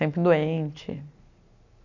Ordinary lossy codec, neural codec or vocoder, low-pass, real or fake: none; none; 7.2 kHz; real